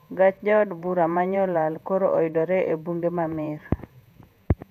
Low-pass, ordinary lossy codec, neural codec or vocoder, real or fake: 14.4 kHz; none; vocoder, 48 kHz, 128 mel bands, Vocos; fake